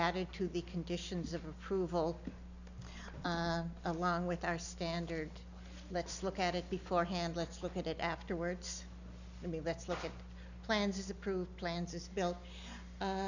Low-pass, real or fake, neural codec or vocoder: 7.2 kHz; real; none